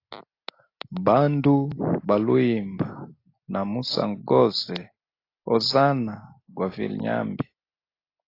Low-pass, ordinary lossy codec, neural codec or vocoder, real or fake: 5.4 kHz; AAC, 32 kbps; none; real